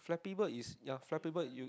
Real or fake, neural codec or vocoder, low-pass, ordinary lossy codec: real; none; none; none